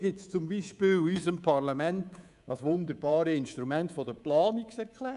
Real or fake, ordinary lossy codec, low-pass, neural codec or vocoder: fake; none; 10.8 kHz; codec, 24 kHz, 3.1 kbps, DualCodec